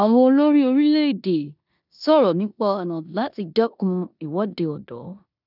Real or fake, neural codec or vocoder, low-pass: fake; codec, 16 kHz in and 24 kHz out, 0.9 kbps, LongCat-Audio-Codec, four codebook decoder; 5.4 kHz